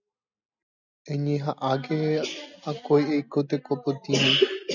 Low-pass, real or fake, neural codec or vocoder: 7.2 kHz; real; none